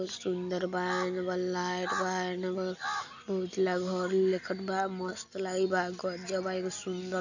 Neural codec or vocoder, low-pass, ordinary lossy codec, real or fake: none; 7.2 kHz; none; real